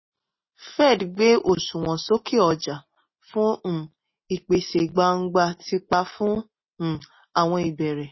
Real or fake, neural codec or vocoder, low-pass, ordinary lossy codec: real; none; 7.2 kHz; MP3, 24 kbps